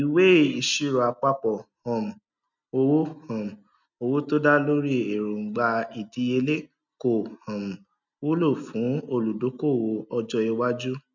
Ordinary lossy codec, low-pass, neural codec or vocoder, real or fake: none; 7.2 kHz; none; real